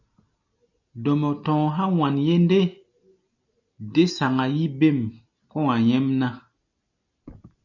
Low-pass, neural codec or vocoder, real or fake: 7.2 kHz; none; real